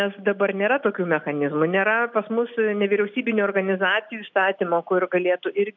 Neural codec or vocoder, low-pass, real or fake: none; 7.2 kHz; real